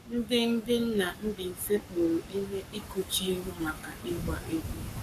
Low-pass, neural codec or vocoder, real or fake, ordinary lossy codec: 14.4 kHz; codec, 44.1 kHz, 7.8 kbps, Pupu-Codec; fake; none